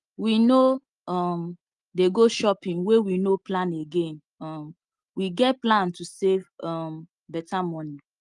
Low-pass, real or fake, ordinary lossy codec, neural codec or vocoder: 10.8 kHz; real; Opus, 32 kbps; none